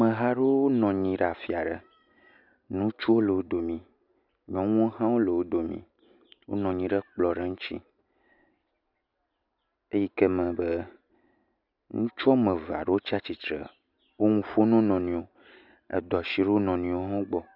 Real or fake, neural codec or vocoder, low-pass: real; none; 5.4 kHz